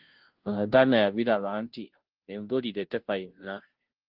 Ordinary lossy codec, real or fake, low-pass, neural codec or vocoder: Opus, 16 kbps; fake; 5.4 kHz; codec, 16 kHz, 0.5 kbps, FunCodec, trained on Chinese and English, 25 frames a second